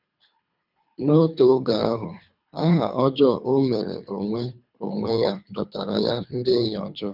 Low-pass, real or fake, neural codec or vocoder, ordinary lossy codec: 5.4 kHz; fake; codec, 24 kHz, 3 kbps, HILCodec; none